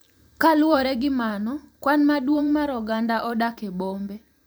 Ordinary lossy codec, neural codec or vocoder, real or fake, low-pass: none; vocoder, 44.1 kHz, 128 mel bands every 512 samples, BigVGAN v2; fake; none